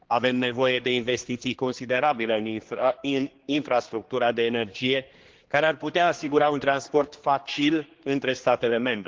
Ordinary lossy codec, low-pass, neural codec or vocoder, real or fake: Opus, 16 kbps; 7.2 kHz; codec, 16 kHz, 2 kbps, X-Codec, HuBERT features, trained on general audio; fake